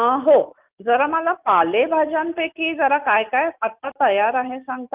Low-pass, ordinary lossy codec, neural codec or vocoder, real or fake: 3.6 kHz; Opus, 24 kbps; none; real